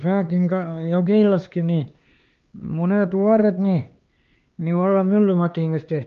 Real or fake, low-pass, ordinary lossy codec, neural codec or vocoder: fake; 7.2 kHz; Opus, 32 kbps; codec, 16 kHz, 2 kbps, X-Codec, HuBERT features, trained on LibriSpeech